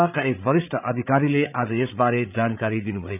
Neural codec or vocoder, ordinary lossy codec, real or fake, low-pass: codec, 16 kHz, 8 kbps, FreqCodec, larger model; none; fake; 3.6 kHz